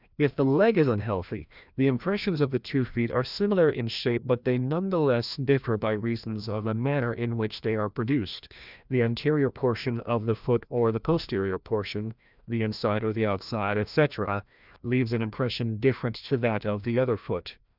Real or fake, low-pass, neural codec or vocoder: fake; 5.4 kHz; codec, 16 kHz, 1 kbps, FreqCodec, larger model